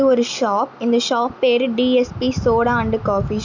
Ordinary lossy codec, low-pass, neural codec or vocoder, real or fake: none; 7.2 kHz; none; real